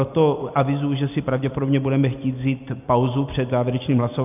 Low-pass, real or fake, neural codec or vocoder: 3.6 kHz; real; none